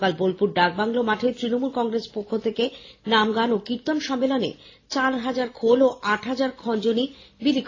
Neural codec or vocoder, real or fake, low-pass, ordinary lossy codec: none; real; 7.2 kHz; AAC, 32 kbps